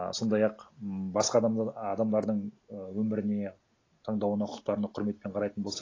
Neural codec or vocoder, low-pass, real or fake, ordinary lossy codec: none; 7.2 kHz; real; AAC, 32 kbps